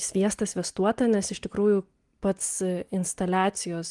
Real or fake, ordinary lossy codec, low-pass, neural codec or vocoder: real; Opus, 24 kbps; 10.8 kHz; none